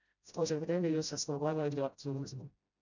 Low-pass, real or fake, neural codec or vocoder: 7.2 kHz; fake; codec, 16 kHz, 0.5 kbps, FreqCodec, smaller model